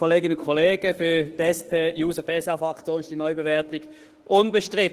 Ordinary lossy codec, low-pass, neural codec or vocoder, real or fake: Opus, 16 kbps; 14.4 kHz; autoencoder, 48 kHz, 32 numbers a frame, DAC-VAE, trained on Japanese speech; fake